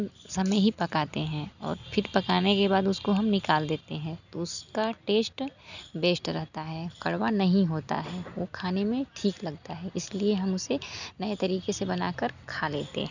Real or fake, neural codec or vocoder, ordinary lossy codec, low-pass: real; none; none; 7.2 kHz